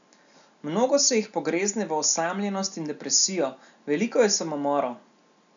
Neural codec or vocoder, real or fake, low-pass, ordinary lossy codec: none; real; 7.2 kHz; none